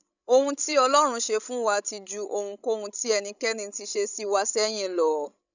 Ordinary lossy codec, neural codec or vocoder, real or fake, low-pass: none; codec, 16 kHz, 16 kbps, FreqCodec, larger model; fake; 7.2 kHz